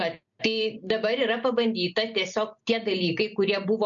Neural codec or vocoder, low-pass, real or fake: none; 7.2 kHz; real